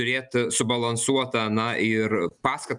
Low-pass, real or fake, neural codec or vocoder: 10.8 kHz; real; none